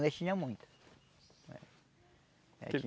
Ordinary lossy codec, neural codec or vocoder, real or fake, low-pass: none; none; real; none